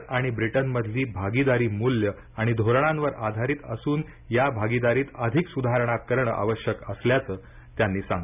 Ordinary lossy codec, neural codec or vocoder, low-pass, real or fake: none; none; 3.6 kHz; real